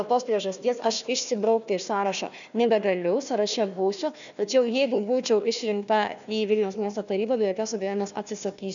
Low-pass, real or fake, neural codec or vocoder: 7.2 kHz; fake; codec, 16 kHz, 1 kbps, FunCodec, trained on Chinese and English, 50 frames a second